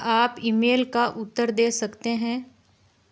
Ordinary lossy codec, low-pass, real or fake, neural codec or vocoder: none; none; real; none